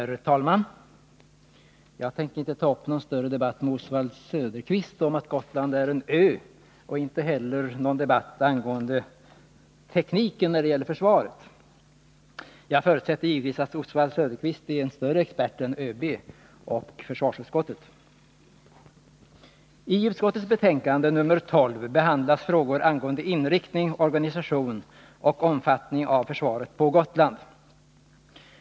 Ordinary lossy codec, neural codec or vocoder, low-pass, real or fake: none; none; none; real